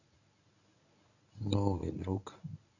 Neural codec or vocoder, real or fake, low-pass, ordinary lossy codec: codec, 24 kHz, 0.9 kbps, WavTokenizer, medium speech release version 1; fake; 7.2 kHz; none